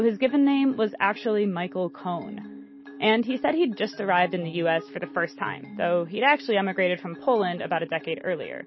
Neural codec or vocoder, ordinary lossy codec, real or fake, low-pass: none; MP3, 24 kbps; real; 7.2 kHz